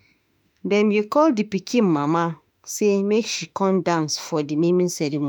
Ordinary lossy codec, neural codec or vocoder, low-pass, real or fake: none; autoencoder, 48 kHz, 32 numbers a frame, DAC-VAE, trained on Japanese speech; none; fake